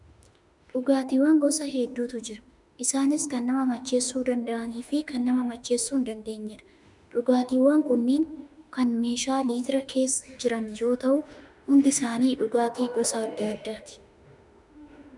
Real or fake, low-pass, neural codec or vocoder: fake; 10.8 kHz; autoencoder, 48 kHz, 32 numbers a frame, DAC-VAE, trained on Japanese speech